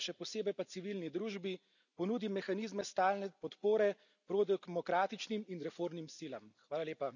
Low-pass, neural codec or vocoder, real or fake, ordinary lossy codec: 7.2 kHz; none; real; none